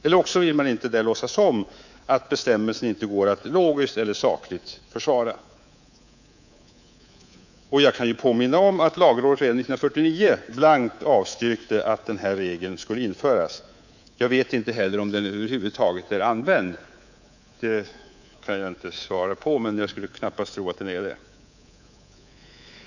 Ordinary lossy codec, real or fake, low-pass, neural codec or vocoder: none; fake; 7.2 kHz; codec, 24 kHz, 3.1 kbps, DualCodec